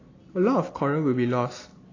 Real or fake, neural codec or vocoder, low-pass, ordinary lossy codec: real; none; 7.2 kHz; AAC, 32 kbps